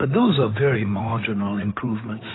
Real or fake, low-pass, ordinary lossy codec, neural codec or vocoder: fake; 7.2 kHz; AAC, 16 kbps; codec, 16 kHz in and 24 kHz out, 2.2 kbps, FireRedTTS-2 codec